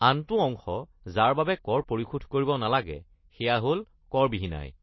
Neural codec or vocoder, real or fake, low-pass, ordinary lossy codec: none; real; 7.2 kHz; MP3, 24 kbps